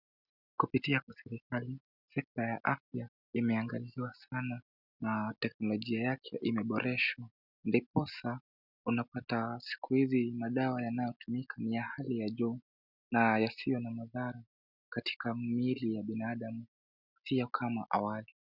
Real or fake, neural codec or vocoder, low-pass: real; none; 5.4 kHz